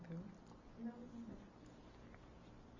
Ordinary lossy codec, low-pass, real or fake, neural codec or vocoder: MP3, 64 kbps; 7.2 kHz; real; none